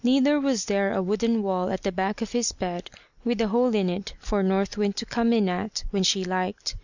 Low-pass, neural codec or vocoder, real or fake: 7.2 kHz; none; real